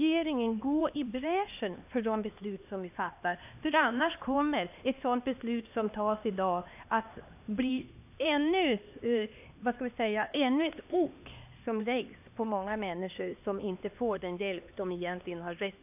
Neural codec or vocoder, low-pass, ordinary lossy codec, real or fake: codec, 16 kHz, 2 kbps, X-Codec, HuBERT features, trained on LibriSpeech; 3.6 kHz; AAC, 32 kbps; fake